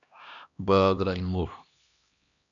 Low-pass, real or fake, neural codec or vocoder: 7.2 kHz; fake; codec, 16 kHz, 1 kbps, X-Codec, HuBERT features, trained on LibriSpeech